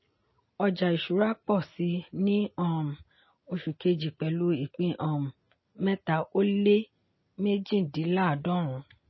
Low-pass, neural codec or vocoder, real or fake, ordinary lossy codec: 7.2 kHz; none; real; MP3, 24 kbps